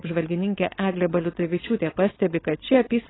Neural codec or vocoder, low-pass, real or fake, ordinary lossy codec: codec, 16 kHz, 4.8 kbps, FACodec; 7.2 kHz; fake; AAC, 16 kbps